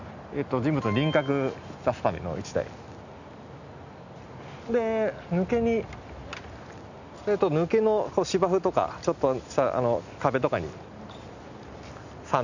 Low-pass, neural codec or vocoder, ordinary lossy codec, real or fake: 7.2 kHz; none; none; real